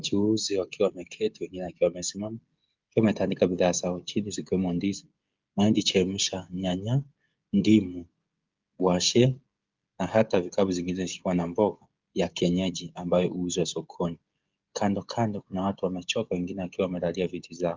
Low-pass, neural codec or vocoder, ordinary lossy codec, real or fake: 7.2 kHz; codec, 16 kHz, 16 kbps, FreqCodec, smaller model; Opus, 24 kbps; fake